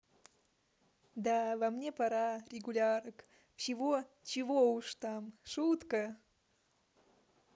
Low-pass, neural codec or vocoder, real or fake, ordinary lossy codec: none; none; real; none